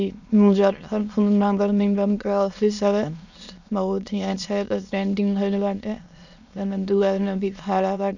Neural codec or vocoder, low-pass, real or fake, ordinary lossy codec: autoencoder, 22.05 kHz, a latent of 192 numbers a frame, VITS, trained on many speakers; 7.2 kHz; fake; none